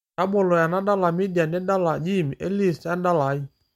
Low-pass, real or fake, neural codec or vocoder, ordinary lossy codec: 19.8 kHz; real; none; MP3, 64 kbps